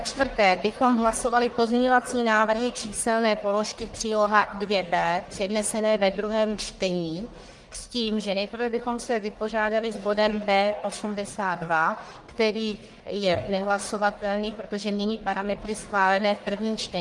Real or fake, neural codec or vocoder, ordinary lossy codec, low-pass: fake; codec, 44.1 kHz, 1.7 kbps, Pupu-Codec; Opus, 24 kbps; 10.8 kHz